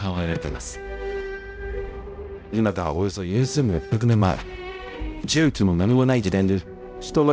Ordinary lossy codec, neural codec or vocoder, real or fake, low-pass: none; codec, 16 kHz, 0.5 kbps, X-Codec, HuBERT features, trained on balanced general audio; fake; none